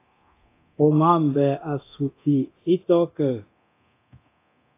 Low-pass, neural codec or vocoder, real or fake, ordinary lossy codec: 3.6 kHz; codec, 24 kHz, 0.9 kbps, DualCodec; fake; AAC, 24 kbps